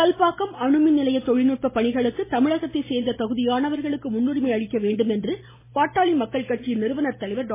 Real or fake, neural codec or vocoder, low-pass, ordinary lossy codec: real; none; 3.6 kHz; MP3, 16 kbps